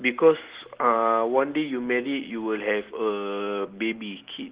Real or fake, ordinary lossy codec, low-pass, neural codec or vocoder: real; Opus, 16 kbps; 3.6 kHz; none